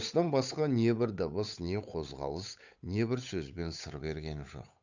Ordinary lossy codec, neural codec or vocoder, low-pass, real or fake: none; codec, 16 kHz, 8 kbps, FunCodec, trained on Chinese and English, 25 frames a second; 7.2 kHz; fake